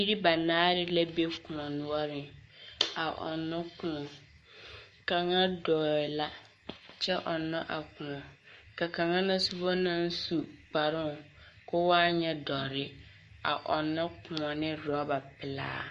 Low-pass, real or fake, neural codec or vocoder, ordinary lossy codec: 14.4 kHz; fake; codec, 44.1 kHz, 7.8 kbps, Pupu-Codec; MP3, 48 kbps